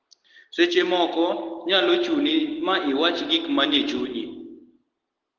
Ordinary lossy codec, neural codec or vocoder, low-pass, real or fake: Opus, 16 kbps; none; 7.2 kHz; real